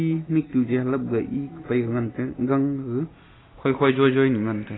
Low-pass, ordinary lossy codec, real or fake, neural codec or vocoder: 7.2 kHz; AAC, 16 kbps; real; none